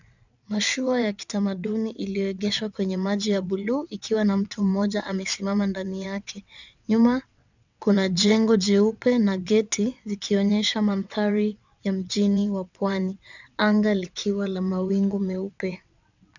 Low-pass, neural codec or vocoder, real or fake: 7.2 kHz; vocoder, 22.05 kHz, 80 mel bands, WaveNeXt; fake